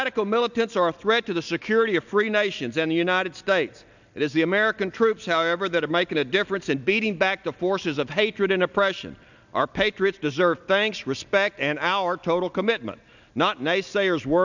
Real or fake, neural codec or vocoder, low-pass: real; none; 7.2 kHz